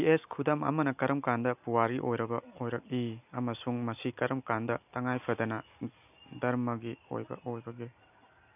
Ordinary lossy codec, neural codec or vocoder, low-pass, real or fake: none; none; 3.6 kHz; real